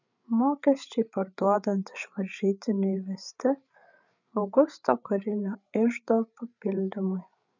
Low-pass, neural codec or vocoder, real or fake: 7.2 kHz; codec, 16 kHz, 8 kbps, FreqCodec, larger model; fake